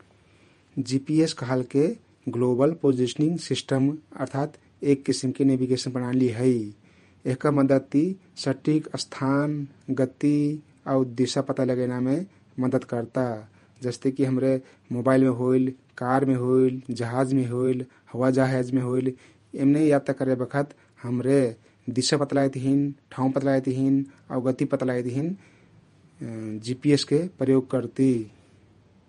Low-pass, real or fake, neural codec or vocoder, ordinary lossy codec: 19.8 kHz; fake; vocoder, 48 kHz, 128 mel bands, Vocos; MP3, 48 kbps